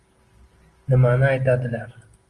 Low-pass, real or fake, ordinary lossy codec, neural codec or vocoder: 10.8 kHz; real; Opus, 24 kbps; none